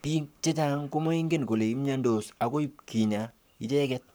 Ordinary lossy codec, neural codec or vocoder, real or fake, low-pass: none; codec, 44.1 kHz, 7.8 kbps, Pupu-Codec; fake; none